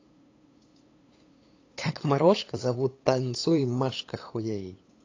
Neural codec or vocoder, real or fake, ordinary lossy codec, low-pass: codec, 16 kHz, 8 kbps, FunCodec, trained on LibriTTS, 25 frames a second; fake; AAC, 32 kbps; 7.2 kHz